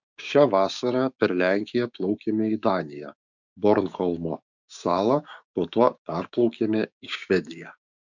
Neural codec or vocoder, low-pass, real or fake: codec, 16 kHz, 6 kbps, DAC; 7.2 kHz; fake